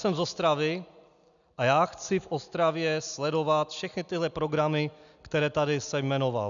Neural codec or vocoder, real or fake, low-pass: none; real; 7.2 kHz